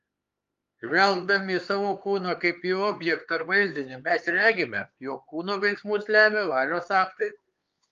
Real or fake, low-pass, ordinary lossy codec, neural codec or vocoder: fake; 7.2 kHz; Opus, 32 kbps; codec, 16 kHz, 4 kbps, X-Codec, HuBERT features, trained on LibriSpeech